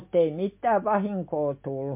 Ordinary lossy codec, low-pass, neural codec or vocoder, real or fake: MP3, 32 kbps; 3.6 kHz; none; real